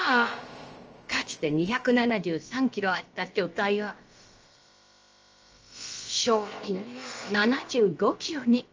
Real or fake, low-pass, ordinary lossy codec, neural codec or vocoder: fake; 7.2 kHz; Opus, 24 kbps; codec, 16 kHz, about 1 kbps, DyCAST, with the encoder's durations